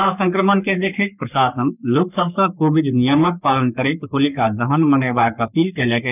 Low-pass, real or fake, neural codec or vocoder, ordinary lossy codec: 3.6 kHz; fake; codec, 44.1 kHz, 3.4 kbps, Pupu-Codec; none